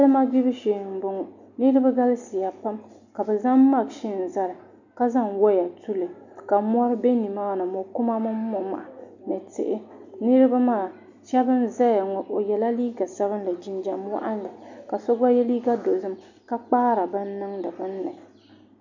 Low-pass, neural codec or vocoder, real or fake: 7.2 kHz; none; real